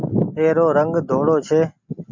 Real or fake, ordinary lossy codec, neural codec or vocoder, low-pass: real; MP3, 64 kbps; none; 7.2 kHz